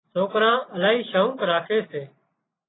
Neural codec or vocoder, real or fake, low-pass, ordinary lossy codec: none; real; 7.2 kHz; AAC, 16 kbps